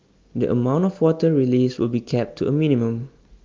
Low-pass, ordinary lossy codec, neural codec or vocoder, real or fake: 7.2 kHz; Opus, 24 kbps; none; real